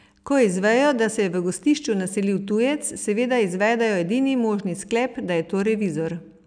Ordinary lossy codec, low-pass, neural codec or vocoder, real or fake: none; 9.9 kHz; none; real